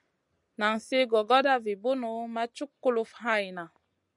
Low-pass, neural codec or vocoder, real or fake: 10.8 kHz; none; real